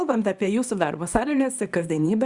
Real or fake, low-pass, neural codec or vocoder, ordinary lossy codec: fake; 10.8 kHz; codec, 24 kHz, 0.9 kbps, WavTokenizer, small release; Opus, 64 kbps